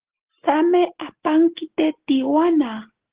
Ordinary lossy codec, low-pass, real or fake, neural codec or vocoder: Opus, 16 kbps; 3.6 kHz; real; none